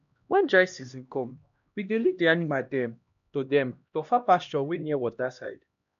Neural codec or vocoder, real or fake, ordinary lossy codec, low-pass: codec, 16 kHz, 1 kbps, X-Codec, HuBERT features, trained on LibriSpeech; fake; none; 7.2 kHz